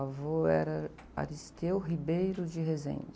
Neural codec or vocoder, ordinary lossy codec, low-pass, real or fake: none; none; none; real